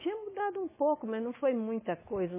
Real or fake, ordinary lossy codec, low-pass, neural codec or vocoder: fake; MP3, 16 kbps; 3.6 kHz; autoencoder, 48 kHz, 128 numbers a frame, DAC-VAE, trained on Japanese speech